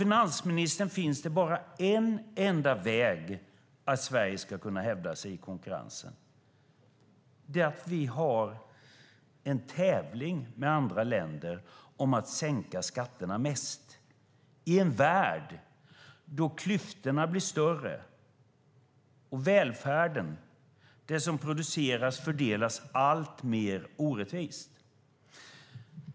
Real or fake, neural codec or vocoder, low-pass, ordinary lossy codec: real; none; none; none